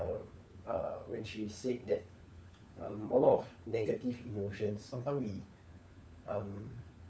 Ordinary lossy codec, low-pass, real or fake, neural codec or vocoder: none; none; fake; codec, 16 kHz, 4 kbps, FunCodec, trained on LibriTTS, 50 frames a second